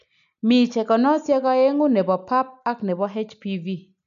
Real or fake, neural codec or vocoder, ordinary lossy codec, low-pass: real; none; none; 7.2 kHz